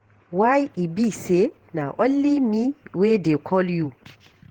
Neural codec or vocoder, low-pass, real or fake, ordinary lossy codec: vocoder, 48 kHz, 128 mel bands, Vocos; 19.8 kHz; fake; Opus, 16 kbps